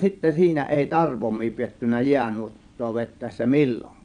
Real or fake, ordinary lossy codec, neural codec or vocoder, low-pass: fake; none; vocoder, 22.05 kHz, 80 mel bands, Vocos; 9.9 kHz